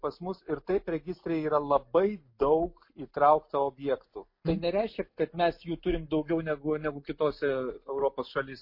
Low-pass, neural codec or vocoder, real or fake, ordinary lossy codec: 5.4 kHz; none; real; MP3, 32 kbps